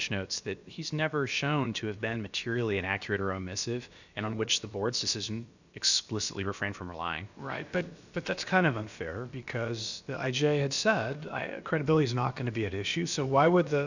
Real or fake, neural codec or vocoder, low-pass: fake; codec, 16 kHz, about 1 kbps, DyCAST, with the encoder's durations; 7.2 kHz